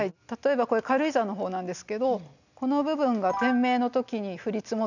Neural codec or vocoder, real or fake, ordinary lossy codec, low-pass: none; real; none; 7.2 kHz